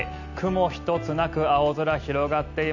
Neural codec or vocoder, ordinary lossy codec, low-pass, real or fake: none; none; 7.2 kHz; real